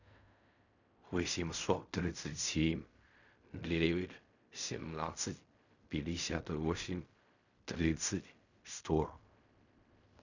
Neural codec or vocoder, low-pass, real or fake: codec, 16 kHz in and 24 kHz out, 0.4 kbps, LongCat-Audio-Codec, fine tuned four codebook decoder; 7.2 kHz; fake